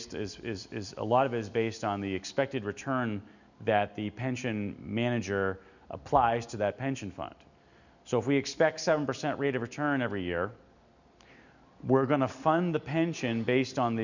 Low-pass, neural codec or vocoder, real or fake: 7.2 kHz; none; real